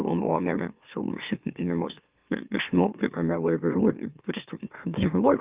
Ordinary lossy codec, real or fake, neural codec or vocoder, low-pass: Opus, 24 kbps; fake; autoencoder, 44.1 kHz, a latent of 192 numbers a frame, MeloTTS; 3.6 kHz